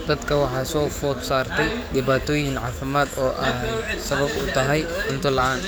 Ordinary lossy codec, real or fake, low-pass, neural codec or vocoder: none; fake; none; codec, 44.1 kHz, 7.8 kbps, DAC